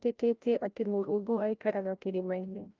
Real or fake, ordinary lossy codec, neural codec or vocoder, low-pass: fake; Opus, 32 kbps; codec, 16 kHz, 0.5 kbps, FreqCodec, larger model; 7.2 kHz